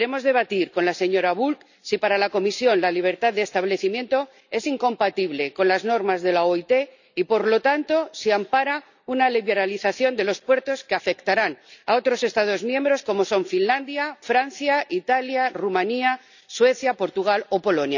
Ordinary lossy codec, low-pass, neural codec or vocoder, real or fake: none; 7.2 kHz; none; real